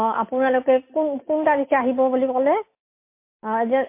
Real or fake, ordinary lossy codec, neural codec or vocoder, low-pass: real; MP3, 24 kbps; none; 3.6 kHz